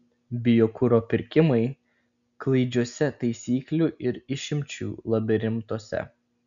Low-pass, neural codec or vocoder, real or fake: 7.2 kHz; none; real